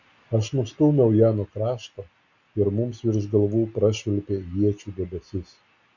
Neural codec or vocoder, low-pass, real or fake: none; 7.2 kHz; real